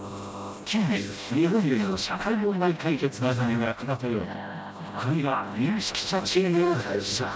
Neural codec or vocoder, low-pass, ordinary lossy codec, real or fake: codec, 16 kHz, 0.5 kbps, FreqCodec, smaller model; none; none; fake